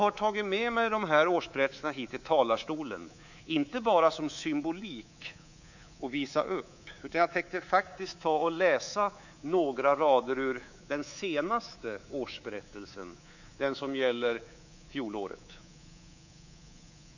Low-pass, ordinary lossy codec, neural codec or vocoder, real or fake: 7.2 kHz; none; codec, 24 kHz, 3.1 kbps, DualCodec; fake